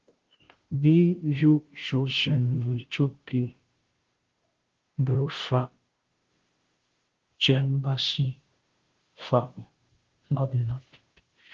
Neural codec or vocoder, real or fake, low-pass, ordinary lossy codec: codec, 16 kHz, 0.5 kbps, FunCodec, trained on Chinese and English, 25 frames a second; fake; 7.2 kHz; Opus, 16 kbps